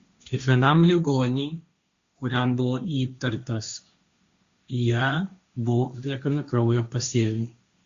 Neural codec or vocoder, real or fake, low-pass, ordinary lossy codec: codec, 16 kHz, 1.1 kbps, Voila-Tokenizer; fake; 7.2 kHz; Opus, 64 kbps